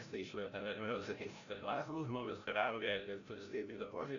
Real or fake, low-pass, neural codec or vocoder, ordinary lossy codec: fake; 7.2 kHz; codec, 16 kHz, 0.5 kbps, FreqCodec, larger model; MP3, 96 kbps